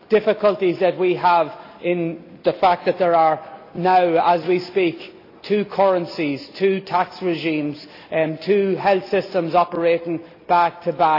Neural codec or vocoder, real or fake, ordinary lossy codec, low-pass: none; real; AAC, 32 kbps; 5.4 kHz